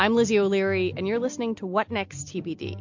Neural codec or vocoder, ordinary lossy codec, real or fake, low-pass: none; MP3, 48 kbps; real; 7.2 kHz